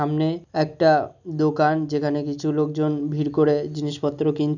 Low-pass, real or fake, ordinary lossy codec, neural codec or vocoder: 7.2 kHz; real; none; none